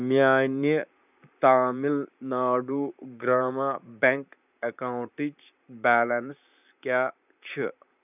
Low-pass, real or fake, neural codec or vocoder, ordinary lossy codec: 3.6 kHz; fake; vocoder, 44.1 kHz, 128 mel bands, Pupu-Vocoder; none